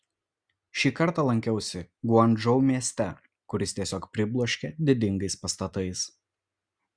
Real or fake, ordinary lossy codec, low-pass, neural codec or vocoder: real; Opus, 64 kbps; 9.9 kHz; none